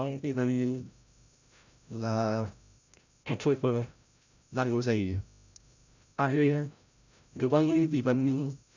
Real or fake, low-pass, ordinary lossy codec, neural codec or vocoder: fake; 7.2 kHz; none; codec, 16 kHz, 0.5 kbps, FreqCodec, larger model